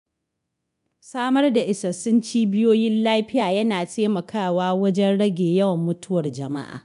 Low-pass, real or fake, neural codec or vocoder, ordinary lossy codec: 10.8 kHz; fake; codec, 24 kHz, 0.9 kbps, DualCodec; none